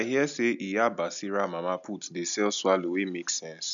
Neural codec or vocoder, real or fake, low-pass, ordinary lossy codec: none; real; 7.2 kHz; none